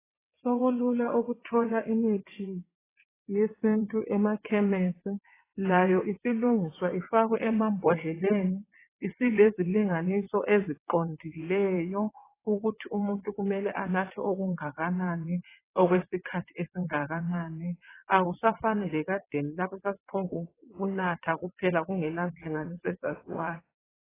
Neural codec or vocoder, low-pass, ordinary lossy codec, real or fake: vocoder, 22.05 kHz, 80 mel bands, WaveNeXt; 3.6 kHz; AAC, 16 kbps; fake